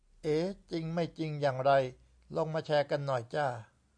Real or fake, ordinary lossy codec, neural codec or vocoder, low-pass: real; AAC, 64 kbps; none; 9.9 kHz